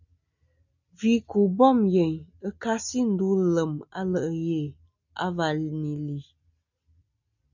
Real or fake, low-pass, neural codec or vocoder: real; 7.2 kHz; none